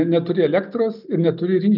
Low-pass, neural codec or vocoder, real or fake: 5.4 kHz; none; real